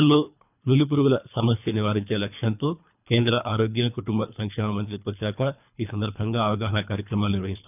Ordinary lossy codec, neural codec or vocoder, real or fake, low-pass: none; codec, 24 kHz, 3 kbps, HILCodec; fake; 3.6 kHz